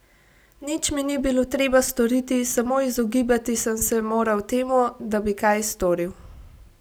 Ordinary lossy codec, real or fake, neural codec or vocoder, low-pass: none; real; none; none